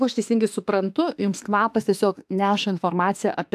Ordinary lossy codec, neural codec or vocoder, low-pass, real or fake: AAC, 96 kbps; autoencoder, 48 kHz, 32 numbers a frame, DAC-VAE, trained on Japanese speech; 14.4 kHz; fake